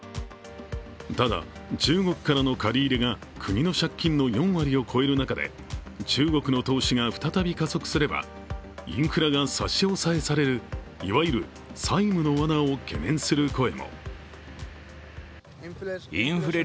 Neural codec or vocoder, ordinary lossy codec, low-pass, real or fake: none; none; none; real